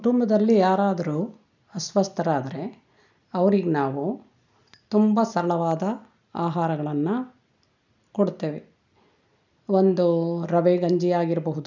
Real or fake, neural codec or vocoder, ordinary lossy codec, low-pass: real; none; none; 7.2 kHz